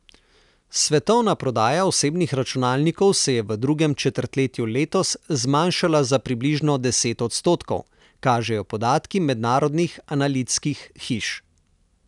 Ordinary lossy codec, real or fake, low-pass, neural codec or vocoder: none; real; 10.8 kHz; none